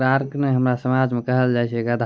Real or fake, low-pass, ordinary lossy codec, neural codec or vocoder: real; none; none; none